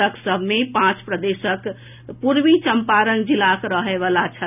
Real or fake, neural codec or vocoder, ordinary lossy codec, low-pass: real; none; none; 3.6 kHz